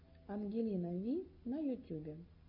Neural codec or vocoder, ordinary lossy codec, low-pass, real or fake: none; MP3, 32 kbps; 5.4 kHz; real